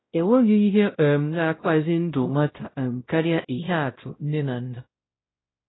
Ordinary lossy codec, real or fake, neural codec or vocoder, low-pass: AAC, 16 kbps; fake; codec, 16 kHz, 0.5 kbps, X-Codec, WavLM features, trained on Multilingual LibriSpeech; 7.2 kHz